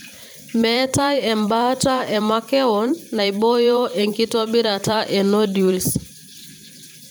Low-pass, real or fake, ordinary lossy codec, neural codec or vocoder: none; fake; none; vocoder, 44.1 kHz, 128 mel bands every 512 samples, BigVGAN v2